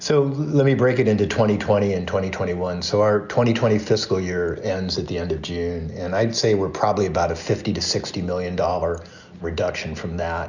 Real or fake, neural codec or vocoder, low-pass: real; none; 7.2 kHz